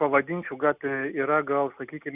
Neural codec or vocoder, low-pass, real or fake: none; 3.6 kHz; real